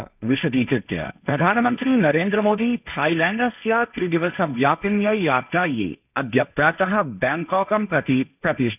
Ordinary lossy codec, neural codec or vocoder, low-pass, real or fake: none; codec, 16 kHz, 1.1 kbps, Voila-Tokenizer; 3.6 kHz; fake